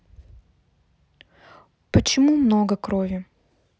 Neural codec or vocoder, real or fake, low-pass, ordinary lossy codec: none; real; none; none